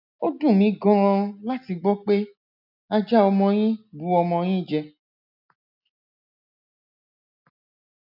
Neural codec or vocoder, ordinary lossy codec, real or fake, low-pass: none; none; real; 5.4 kHz